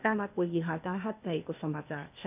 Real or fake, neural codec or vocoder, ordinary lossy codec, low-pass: fake; codec, 16 kHz, 0.8 kbps, ZipCodec; none; 3.6 kHz